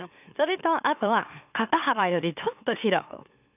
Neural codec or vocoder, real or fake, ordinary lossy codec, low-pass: autoencoder, 44.1 kHz, a latent of 192 numbers a frame, MeloTTS; fake; none; 3.6 kHz